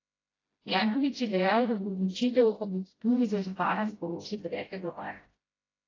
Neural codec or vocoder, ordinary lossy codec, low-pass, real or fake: codec, 16 kHz, 0.5 kbps, FreqCodec, smaller model; AAC, 32 kbps; 7.2 kHz; fake